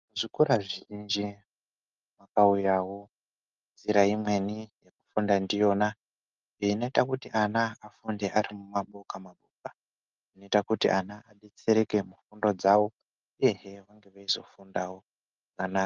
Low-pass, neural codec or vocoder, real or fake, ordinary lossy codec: 7.2 kHz; none; real; Opus, 32 kbps